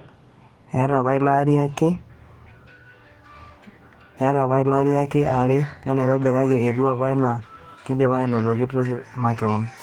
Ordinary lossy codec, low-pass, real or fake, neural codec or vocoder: Opus, 24 kbps; 19.8 kHz; fake; codec, 44.1 kHz, 2.6 kbps, DAC